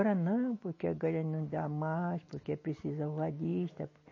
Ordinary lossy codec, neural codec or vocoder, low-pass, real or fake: MP3, 32 kbps; none; 7.2 kHz; real